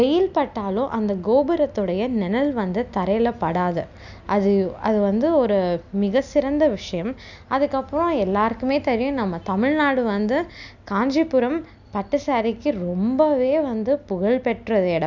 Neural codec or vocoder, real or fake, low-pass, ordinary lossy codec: none; real; 7.2 kHz; none